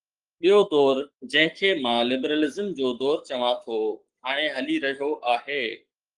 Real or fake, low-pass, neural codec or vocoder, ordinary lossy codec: fake; 10.8 kHz; codec, 44.1 kHz, 7.8 kbps, Pupu-Codec; Opus, 24 kbps